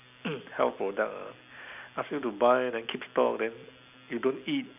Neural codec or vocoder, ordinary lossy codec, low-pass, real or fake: none; none; 3.6 kHz; real